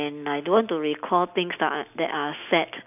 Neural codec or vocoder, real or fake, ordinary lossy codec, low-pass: none; real; none; 3.6 kHz